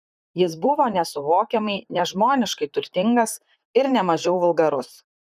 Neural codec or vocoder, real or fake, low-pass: vocoder, 44.1 kHz, 128 mel bands, Pupu-Vocoder; fake; 14.4 kHz